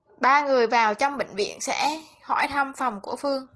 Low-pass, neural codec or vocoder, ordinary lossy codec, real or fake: 10.8 kHz; none; Opus, 16 kbps; real